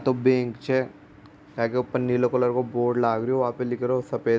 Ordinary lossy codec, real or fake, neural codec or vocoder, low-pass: none; real; none; none